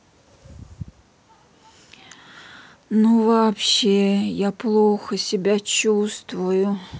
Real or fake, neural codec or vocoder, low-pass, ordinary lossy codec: real; none; none; none